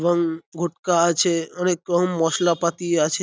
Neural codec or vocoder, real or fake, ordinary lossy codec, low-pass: none; real; none; none